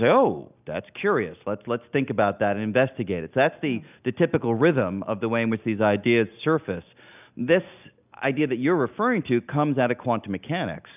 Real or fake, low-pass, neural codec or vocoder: real; 3.6 kHz; none